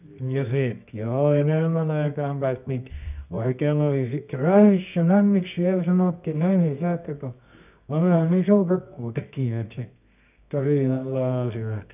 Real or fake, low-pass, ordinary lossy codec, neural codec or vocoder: fake; 3.6 kHz; none; codec, 24 kHz, 0.9 kbps, WavTokenizer, medium music audio release